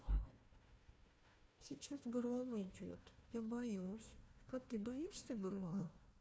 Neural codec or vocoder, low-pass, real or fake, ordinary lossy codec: codec, 16 kHz, 1 kbps, FunCodec, trained on Chinese and English, 50 frames a second; none; fake; none